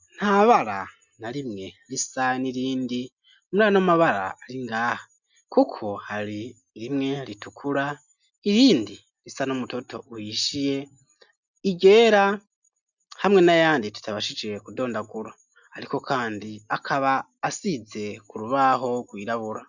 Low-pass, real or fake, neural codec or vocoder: 7.2 kHz; real; none